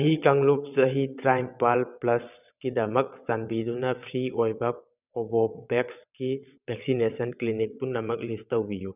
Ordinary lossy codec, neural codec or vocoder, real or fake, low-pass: none; vocoder, 22.05 kHz, 80 mel bands, WaveNeXt; fake; 3.6 kHz